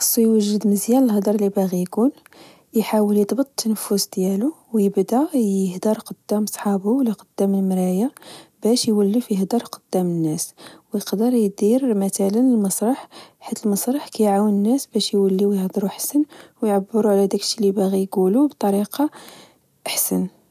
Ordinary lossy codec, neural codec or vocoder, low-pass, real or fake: none; none; 14.4 kHz; real